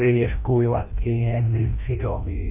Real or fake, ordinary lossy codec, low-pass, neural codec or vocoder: fake; none; 3.6 kHz; codec, 16 kHz, 1 kbps, FreqCodec, larger model